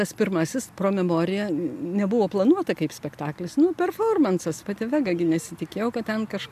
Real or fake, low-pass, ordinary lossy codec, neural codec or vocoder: real; 14.4 kHz; AAC, 96 kbps; none